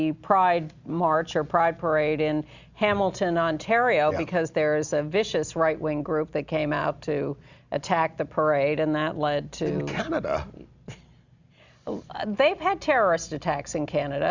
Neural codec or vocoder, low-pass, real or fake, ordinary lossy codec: none; 7.2 kHz; real; Opus, 64 kbps